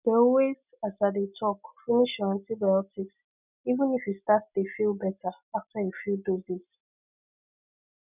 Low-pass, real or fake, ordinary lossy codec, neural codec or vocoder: 3.6 kHz; real; none; none